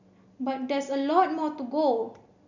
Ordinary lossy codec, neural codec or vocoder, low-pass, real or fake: none; none; 7.2 kHz; real